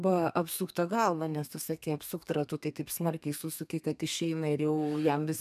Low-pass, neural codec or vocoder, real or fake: 14.4 kHz; codec, 32 kHz, 1.9 kbps, SNAC; fake